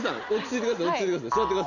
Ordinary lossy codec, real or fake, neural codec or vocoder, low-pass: none; real; none; 7.2 kHz